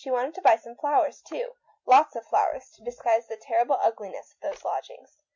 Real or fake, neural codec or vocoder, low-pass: real; none; 7.2 kHz